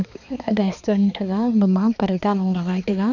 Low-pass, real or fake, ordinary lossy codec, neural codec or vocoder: 7.2 kHz; fake; none; codec, 16 kHz, 2 kbps, X-Codec, HuBERT features, trained on balanced general audio